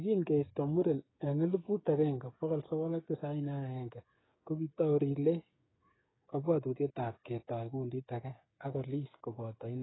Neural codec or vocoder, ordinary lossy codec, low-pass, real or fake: codec, 16 kHz, 8 kbps, FreqCodec, smaller model; AAC, 16 kbps; 7.2 kHz; fake